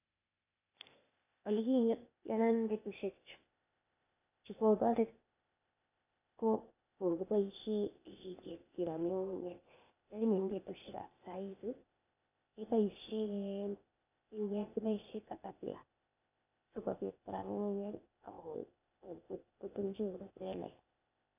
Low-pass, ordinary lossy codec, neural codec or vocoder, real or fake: 3.6 kHz; none; codec, 16 kHz, 0.8 kbps, ZipCodec; fake